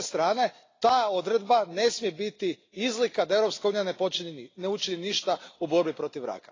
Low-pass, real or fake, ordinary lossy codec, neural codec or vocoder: 7.2 kHz; real; AAC, 32 kbps; none